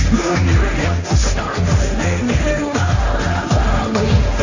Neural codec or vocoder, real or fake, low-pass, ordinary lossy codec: codec, 16 kHz, 1.1 kbps, Voila-Tokenizer; fake; 7.2 kHz; none